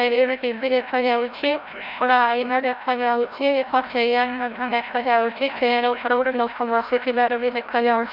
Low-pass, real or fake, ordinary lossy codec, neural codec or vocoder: 5.4 kHz; fake; none; codec, 16 kHz, 0.5 kbps, FreqCodec, larger model